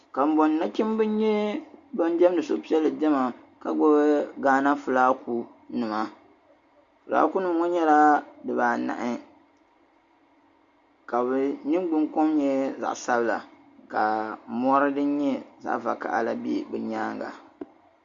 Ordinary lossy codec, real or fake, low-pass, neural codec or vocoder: Opus, 64 kbps; real; 7.2 kHz; none